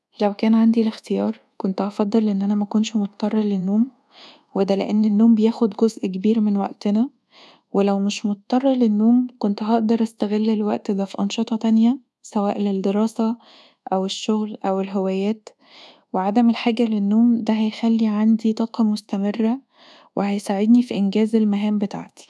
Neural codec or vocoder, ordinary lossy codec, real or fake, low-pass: codec, 24 kHz, 1.2 kbps, DualCodec; none; fake; none